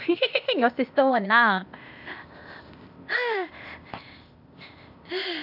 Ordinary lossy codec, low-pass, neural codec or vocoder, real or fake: none; 5.4 kHz; codec, 16 kHz, 0.8 kbps, ZipCodec; fake